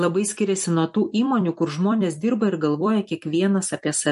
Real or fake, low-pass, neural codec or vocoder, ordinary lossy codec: fake; 14.4 kHz; vocoder, 44.1 kHz, 128 mel bands every 256 samples, BigVGAN v2; MP3, 48 kbps